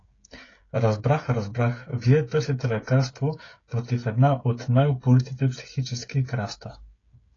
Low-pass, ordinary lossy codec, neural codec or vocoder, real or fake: 7.2 kHz; AAC, 32 kbps; codec, 16 kHz, 16 kbps, FreqCodec, smaller model; fake